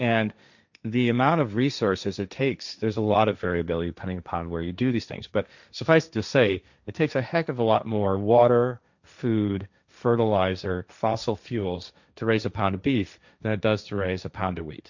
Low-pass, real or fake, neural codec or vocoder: 7.2 kHz; fake; codec, 16 kHz, 1.1 kbps, Voila-Tokenizer